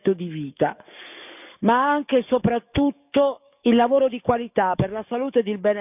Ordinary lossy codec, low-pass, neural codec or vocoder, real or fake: none; 3.6 kHz; codec, 44.1 kHz, 7.8 kbps, DAC; fake